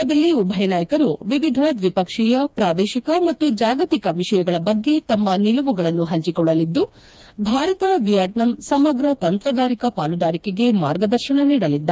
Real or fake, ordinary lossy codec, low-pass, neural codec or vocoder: fake; none; none; codec, 16 kHz, 2 kbps, FreqCodec, smaller model